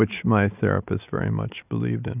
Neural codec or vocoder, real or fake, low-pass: none; real; 3.6 kHz